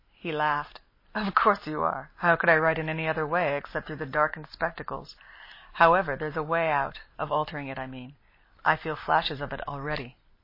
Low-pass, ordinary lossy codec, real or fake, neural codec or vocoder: 5.4 kHz; MP3, 24 kbps; real; none